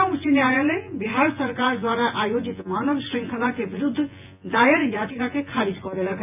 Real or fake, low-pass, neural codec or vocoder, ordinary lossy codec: fake; 3.6 kHz; vocoder, 24 kHz, 100 mel bands, Vocos; AAC, 32 kbps